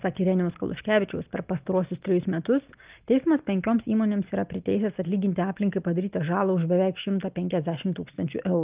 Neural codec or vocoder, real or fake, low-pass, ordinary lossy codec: none; real; 3.6 kHz; Opus, 32 kbps